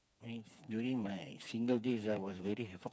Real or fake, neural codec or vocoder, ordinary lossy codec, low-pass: fake; codec, 16 kHz, 4 kbps, FreqCodec, smaller model; none; none